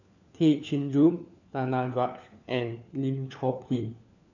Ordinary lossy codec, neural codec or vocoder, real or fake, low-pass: none; codec, 16 kHz, 4 kbps, FunCodec, trained on LibriTTS, 50 frames a second; fake; 7.2 kHz